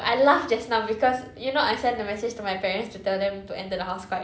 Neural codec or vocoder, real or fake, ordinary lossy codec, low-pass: none; real; none; none